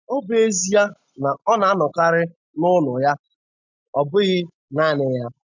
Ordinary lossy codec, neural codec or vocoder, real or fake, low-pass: MP3, 64 kbps; none; real; 7.2 kHz